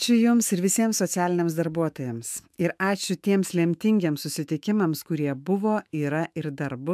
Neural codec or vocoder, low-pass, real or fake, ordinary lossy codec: autoencoder, 48 kHz, 128 numbers a frame, DAC-VAE, trained on Japanese speech; 14.4 kHz; fake; MP3, 96 kbps